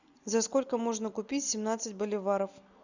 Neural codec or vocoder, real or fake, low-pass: none; real; 7.2 kHz